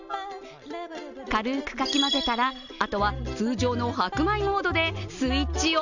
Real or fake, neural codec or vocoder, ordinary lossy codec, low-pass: real; none; none; 7.2 kHz